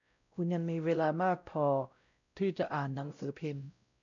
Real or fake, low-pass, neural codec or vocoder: fake; 7.2 kHz; codec, 16 kHz, 0.5 kbps, X-Codec, WavLM features, trained on Multilingual LibriSpeech